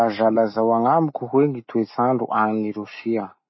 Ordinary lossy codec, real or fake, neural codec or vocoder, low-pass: MP3, 24 kbps; real; none; 7.2 kHz